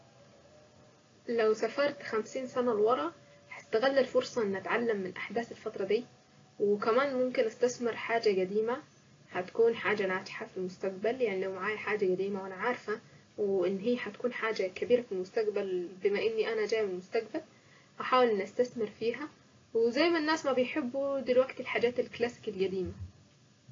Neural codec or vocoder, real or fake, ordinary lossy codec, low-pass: none; real; AAC, 32 kbps; 7.2 kHz